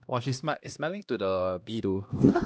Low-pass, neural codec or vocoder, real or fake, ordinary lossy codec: none; codec, 16 kHz, 1 kbps, X-Codec, HuBERT features, trained on LibriSpeech; fake; none